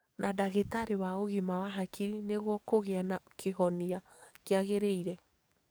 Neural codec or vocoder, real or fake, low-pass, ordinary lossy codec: codec, 44.1 kHz, 7.8 kbps, DAC; fake; none; none